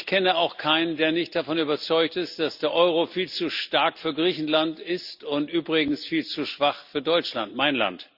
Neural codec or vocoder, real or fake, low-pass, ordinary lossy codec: none; real; 5.4 kHz; Opus, 64 kbps